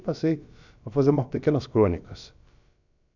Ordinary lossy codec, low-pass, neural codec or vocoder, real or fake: none; 7.2 kHz; codec, 16 kHz, about 1 kbps, DyCAST, with the encoder's durations; fake